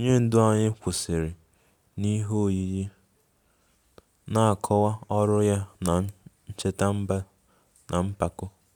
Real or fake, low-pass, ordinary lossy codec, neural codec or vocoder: real; none; none; none